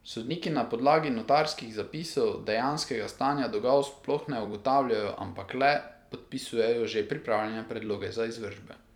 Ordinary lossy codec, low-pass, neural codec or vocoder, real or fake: none; 19.8 kHz; vocoder, 44.1 kHz, 128 mel bands every 256 samples, BigVGAN v2; fake